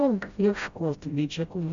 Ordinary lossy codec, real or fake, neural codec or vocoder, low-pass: Opus, 64 kbps; fake; codec, 16 kHz, 0.5 kbps, FreqCodec, smaller model; 7.2 kHz